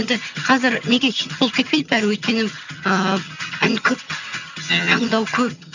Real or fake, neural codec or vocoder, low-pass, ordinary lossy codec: fake; vocoder, 22.05 kHz, 80 mel bands, HiFi-GAN; 7.2 kHz; none